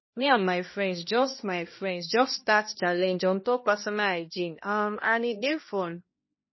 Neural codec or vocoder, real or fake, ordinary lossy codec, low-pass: codec, 16 kHz, 1 kbps, X-Codec, HuBERT features, trained on balanced general audio; fake; MP3, 24 kbps; 7.2 kHz